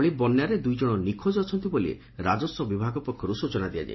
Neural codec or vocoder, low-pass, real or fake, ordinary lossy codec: none; 7.2 kHz; real; MP3, 24 kbps